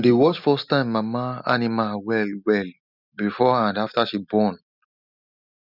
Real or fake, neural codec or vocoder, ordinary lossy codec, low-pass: real; none; none; 5.4 kHz